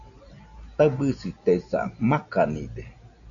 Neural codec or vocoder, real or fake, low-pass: none; real; 7.2 kHz